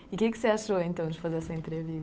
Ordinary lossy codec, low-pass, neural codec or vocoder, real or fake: none; none; codec, 16 kHz, 8 kbps, FunCodec, trained on Chinese and English, 25 frames a second; fake